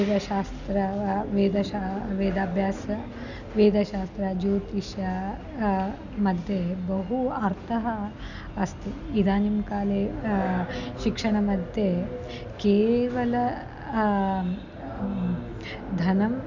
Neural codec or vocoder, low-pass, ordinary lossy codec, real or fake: none; 7.2 kHz; none; real